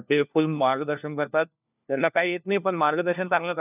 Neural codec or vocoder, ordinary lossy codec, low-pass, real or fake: codec, 16 kHz, 1 kbps, FunCodec, trained on LibriTTS, 50 frames a second; none; 3.6 kHz; fake